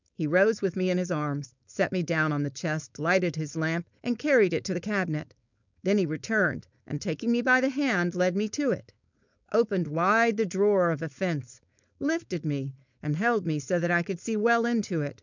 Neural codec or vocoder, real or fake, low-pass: codec, 16 kHz, 4.8 kbps, FACodec; fake; 7.2 kHz